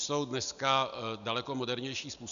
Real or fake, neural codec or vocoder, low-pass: real; none; 7.2 kHz